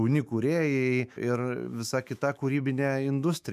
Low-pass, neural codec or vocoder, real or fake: 14.4 kHz; autoencoder, 48 kHz, 128 numbers a frame, DAC-VAE, trained on Japanese speech; fake